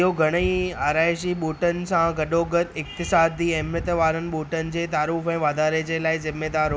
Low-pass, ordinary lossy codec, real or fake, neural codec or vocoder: none; none; real; none